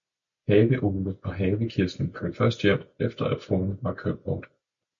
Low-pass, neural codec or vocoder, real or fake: 7.2 kHz; none; real